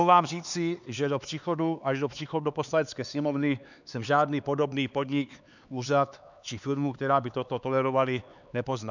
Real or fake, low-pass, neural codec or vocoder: fake; 7.2 kHz; codec, 16 kHz, 4 kbps, X-Codec, HuBERT features, trained on LibriSpeech